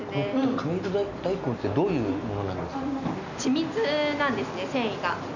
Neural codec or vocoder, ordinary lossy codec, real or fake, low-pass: none; none; real; 7.2 kHz